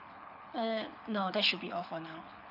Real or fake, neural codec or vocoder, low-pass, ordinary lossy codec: fake; codec, 24 kHz, 6 kbps, HILCodec; 5.4 kHz; none